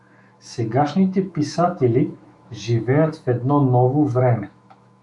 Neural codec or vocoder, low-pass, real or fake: autoencoder, 48 kHz, 128 numbers a frame, DAC-VAE, trained on Japanese speech; 10.8 kHz; fake